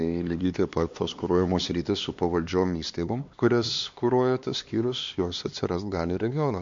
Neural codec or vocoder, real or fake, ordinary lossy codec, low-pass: codec, 16 kHz, 2 kbps, X-Codec, HuBERT features, trained on LibriSpeech; fake; MP3, 48 kbps; 7.2 kHz